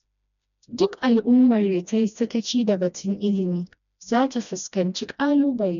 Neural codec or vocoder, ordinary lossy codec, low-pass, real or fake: codec, 16 kHz, 1 kbps, FreqCodec, smaller model; none; 7.2 kHz; fake